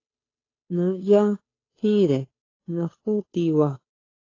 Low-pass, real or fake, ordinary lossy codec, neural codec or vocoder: 7.2 kHz; fake; AAC, 32 kbps; codec, 16 kHz, 2 kbps, FunCodec, trained on Chinese and English, 25 frames a second